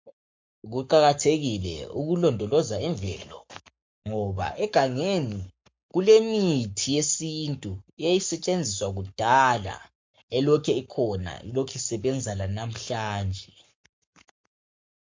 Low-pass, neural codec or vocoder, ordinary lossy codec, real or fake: 7.2 kHz; codec, 44.1 kHz, 7.8 kbps, Pupu-Codec; MP3, 48 kbps; fake